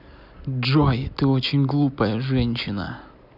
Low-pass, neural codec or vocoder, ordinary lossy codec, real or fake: 5.4 kHz; none; none; real